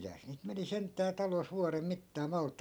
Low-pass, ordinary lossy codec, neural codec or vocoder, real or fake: none; none; none; real